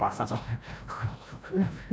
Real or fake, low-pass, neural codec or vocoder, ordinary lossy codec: fake; none; codec, 16 kHz, 0.5 kbps, FreqCodec, larger model; none